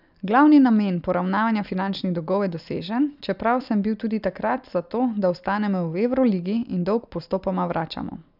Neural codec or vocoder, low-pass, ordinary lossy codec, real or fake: none; 5.4 kHz; none; real